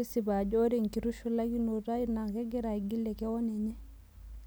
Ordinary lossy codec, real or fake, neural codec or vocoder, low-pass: none; real; none; none